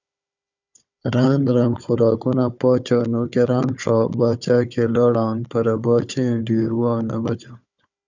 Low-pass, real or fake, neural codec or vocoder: 7.2 kHz; fake; codec, 16 kHz, 4 kbps, FunCodec, trained on Chinese and English, 50 frames a second